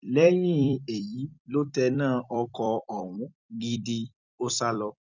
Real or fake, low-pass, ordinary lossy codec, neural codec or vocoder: fake; 7.2 kHz; none; vocoder, 44.1 kHz, 128 mel bands every 256 samples, BigVGAN v2